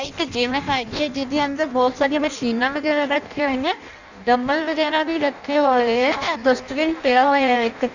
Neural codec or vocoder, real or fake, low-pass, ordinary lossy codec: codec, 16 kHz in and 24 kHz out, 0.6 kbps, FireRedTTS-2 codec; fake; 7.2 kHz; none